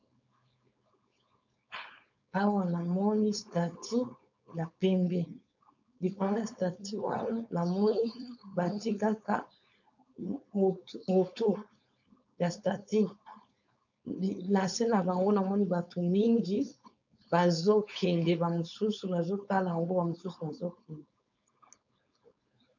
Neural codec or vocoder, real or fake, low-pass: codec, 16 kHz, 4.8 kbps, FACodec; fake; 7.2 kHz